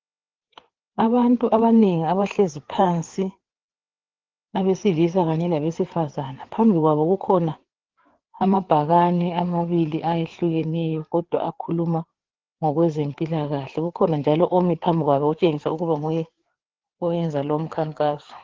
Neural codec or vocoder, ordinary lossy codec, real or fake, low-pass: codec, 16 kHz, 4 kbps, FreqCodec, larger model; Opus, 16 kbps; fake; 7.2 kHz